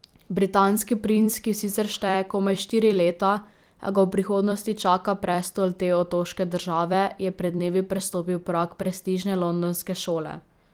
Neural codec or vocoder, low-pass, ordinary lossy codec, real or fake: vocoder, 44.1 kHz, 128 mel bands every 256 samples, BigVGAN v2; 19.8 kHz; Opus, 24 kbps; fake